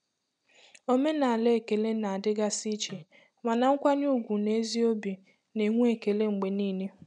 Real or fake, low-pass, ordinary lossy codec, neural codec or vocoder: real; 10.8 kHz; none; none